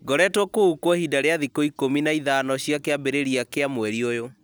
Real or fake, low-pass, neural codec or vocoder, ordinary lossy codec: real; none; none; none